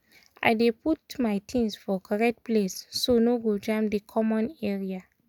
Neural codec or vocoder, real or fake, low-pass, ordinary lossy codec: none; real; 19.8 kHz; none